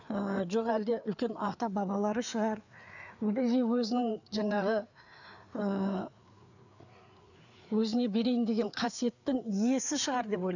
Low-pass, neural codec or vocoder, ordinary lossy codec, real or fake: 7.2 kHz; codec, 16 kHz, 4 kbps, FreqCodec, larger model; none; fake